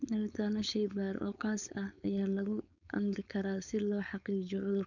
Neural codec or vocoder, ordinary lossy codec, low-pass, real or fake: codec, 16 kHz, 4.8 kbps, FACodec; none; 7.2 kHz; fake